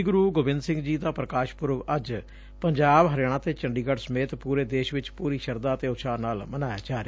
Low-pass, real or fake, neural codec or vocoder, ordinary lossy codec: none; real; none; none